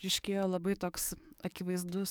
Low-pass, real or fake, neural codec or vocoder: 19.8 kHz; fake; codec, 44.1 kHz, 7.8 kbps, DAC